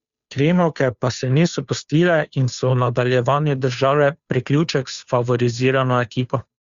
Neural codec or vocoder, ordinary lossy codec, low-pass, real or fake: codec, 16 kHz, 2 kbps, FunCodec, trained on Chinese and English, 25 frames a second; Opus, 64 kbps; 7.2 kHz; fake